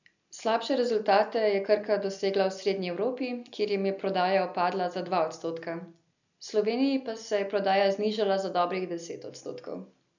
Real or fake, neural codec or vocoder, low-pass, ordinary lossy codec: real; none; 7.2 kHz; none